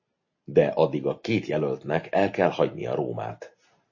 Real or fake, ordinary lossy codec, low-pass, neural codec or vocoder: real; MP3, 32 kbps; 7.2 kHz; none